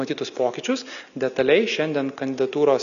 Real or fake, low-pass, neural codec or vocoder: real; 7.2 kHz; none